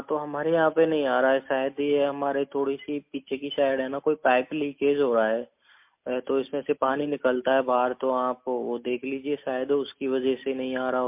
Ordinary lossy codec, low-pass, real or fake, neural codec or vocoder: MP3, 32 kbps; 3.6 kHz; real; none